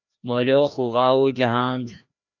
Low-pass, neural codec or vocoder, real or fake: 7.2 kHz; codec, 16 kHz, 1 kbps, FreqCodec, larger model; fake